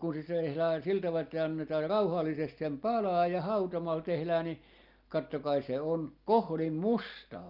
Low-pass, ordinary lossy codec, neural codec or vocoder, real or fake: 5.4 kHz; Opus, 32 kbps; none; real